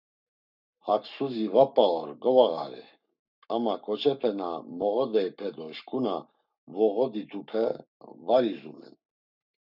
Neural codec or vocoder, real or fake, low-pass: codec, 44.1 kHz, 7.8 kbps, Pupu-Codec; fake; 5.4 kHz